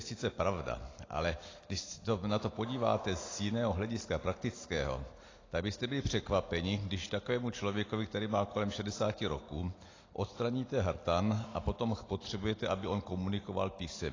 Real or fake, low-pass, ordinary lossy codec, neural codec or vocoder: real; 7.2 kHz; AAC, 32 kbps; none